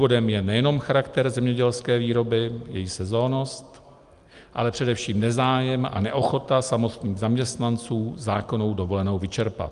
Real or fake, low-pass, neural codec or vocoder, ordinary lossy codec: real; 10.8 kHz; none; Opus, 32 kbps